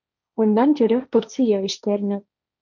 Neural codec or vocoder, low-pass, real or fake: codec, 16 kHz, 1.1 kbps, Voila-Tokenizer; 7.2 kHz; fake